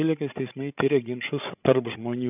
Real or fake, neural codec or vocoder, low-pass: fake; codec, 16 kHz, 16 kbps, FreqCodec, larger model; 3.6 kHz